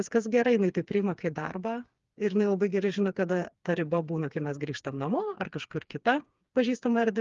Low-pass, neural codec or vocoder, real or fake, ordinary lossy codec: 7.2 kHz; codec, 16 kHz, 4 kbps, FreqCodec, smaller model; fake; Opus, 32 kbps